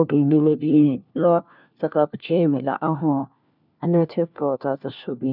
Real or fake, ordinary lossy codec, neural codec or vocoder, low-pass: fake; none; codec, 16 kHz, 1 kbps, FunCodec, trained on LibriTTS, 50 frames a second; 5.4 kHz